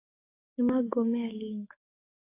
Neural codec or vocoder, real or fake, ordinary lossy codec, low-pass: codec, 44.1 kHz, 7.8 kbps, DAC; fake; AAC, 16 kbps; 3.6 kHz